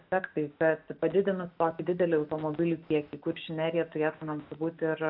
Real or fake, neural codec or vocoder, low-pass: real; none; 5.4 kHz